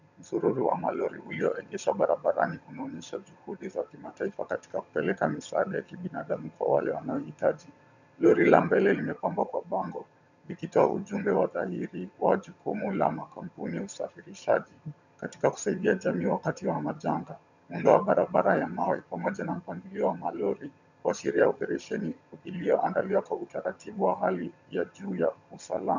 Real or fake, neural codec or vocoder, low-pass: fake; vocoder, 22.05 kHz, 80 mel bands, HiFi-GAN; 7.2 kHz